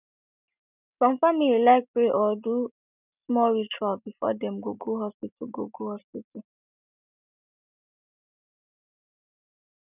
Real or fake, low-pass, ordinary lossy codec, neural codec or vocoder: real; 3.6 kHz; none; none